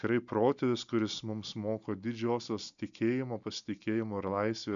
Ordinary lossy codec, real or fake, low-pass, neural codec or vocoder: MP3, 96 kbps; real; 7.2 kHz; none